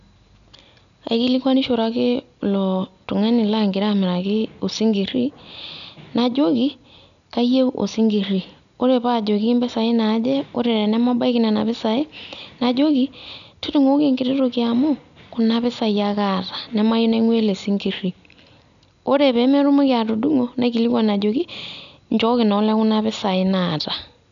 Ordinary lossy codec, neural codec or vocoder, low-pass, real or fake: none; none; 7.2 kHz; real